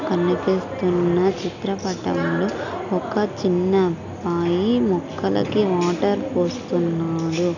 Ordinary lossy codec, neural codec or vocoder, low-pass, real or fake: none; none; 7.2 kHz; real